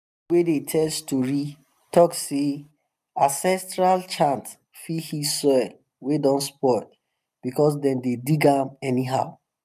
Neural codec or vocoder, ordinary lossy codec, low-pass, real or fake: none; none; 14.4 kHz; real